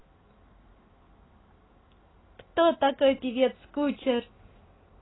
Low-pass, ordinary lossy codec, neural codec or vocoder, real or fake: 7.2 kHz; AAC, 16 kbps; none; real